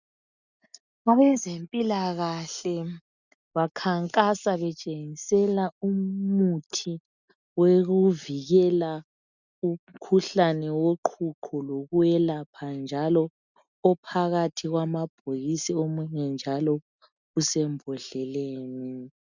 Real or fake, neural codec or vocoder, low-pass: real; none; 7.2 kHz